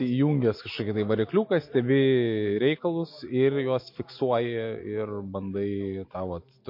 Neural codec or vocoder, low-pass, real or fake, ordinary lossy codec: none; 5.4 kHz; real; MP3, 32 kbps